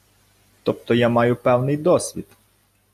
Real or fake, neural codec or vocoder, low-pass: real; none; 14.4 kHz